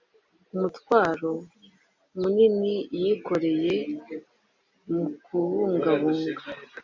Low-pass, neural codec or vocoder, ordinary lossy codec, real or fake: 7.2 kHz; none; MP3, 64 kbps; real